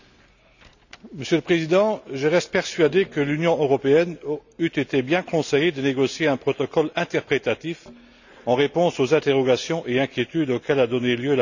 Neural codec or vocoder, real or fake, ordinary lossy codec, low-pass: none; real; none; 7.2 kHz